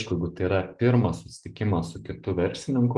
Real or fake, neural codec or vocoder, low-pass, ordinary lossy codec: real; none; 10.8 kHz; Opus, 24 kbps